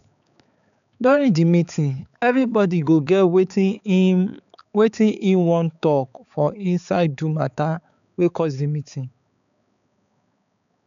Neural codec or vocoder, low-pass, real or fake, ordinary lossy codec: codec, 16 kHz, 4 kbps, X-Codec, HuBERT features, trained on balanced general audio; 7.2 kHz; fake; none